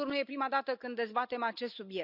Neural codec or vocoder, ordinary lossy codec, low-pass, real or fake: none; none; 5.4 kHz; real